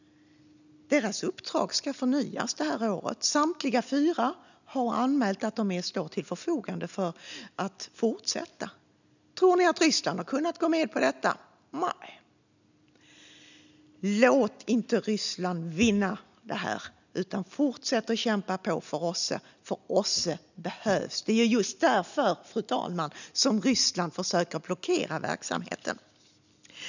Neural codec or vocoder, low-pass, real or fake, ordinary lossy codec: none; 7.2 kHz; real; none